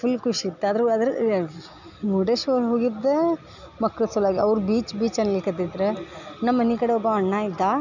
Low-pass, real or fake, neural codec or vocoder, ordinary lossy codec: 7.2 kHz; real; none; none